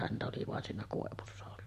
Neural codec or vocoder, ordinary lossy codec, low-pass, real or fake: vocoder, 44.1 kHz, 128 mel bands every 256 samples, BigVGAN v2; MP3, 64 kbps; 14.4 kHz; fake